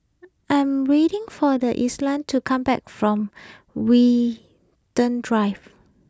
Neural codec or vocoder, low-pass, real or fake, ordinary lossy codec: none; none; real; none